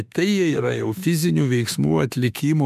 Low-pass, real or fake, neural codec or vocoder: 14.4 kHz; fake; autoencoder, 48 kHz, 32 numbers a frame, DAC-VAE, trained on Japanese speech